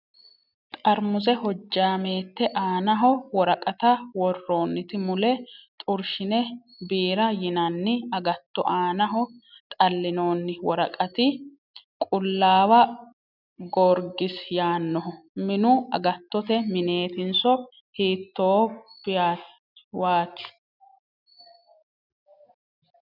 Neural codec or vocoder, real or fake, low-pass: none; real; 5.4 kHz